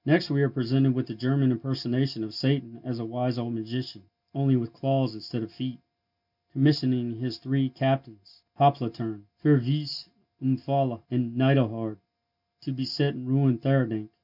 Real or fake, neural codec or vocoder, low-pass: real; none; 5.4 kHz